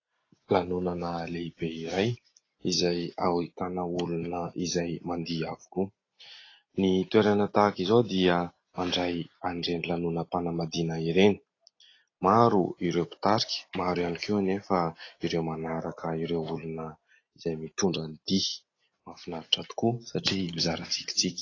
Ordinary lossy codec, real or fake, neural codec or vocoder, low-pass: AAC, 32 kbps; real; none; 7.2 kHz